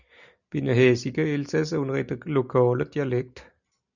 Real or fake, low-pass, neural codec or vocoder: real; 7.2 kHz; none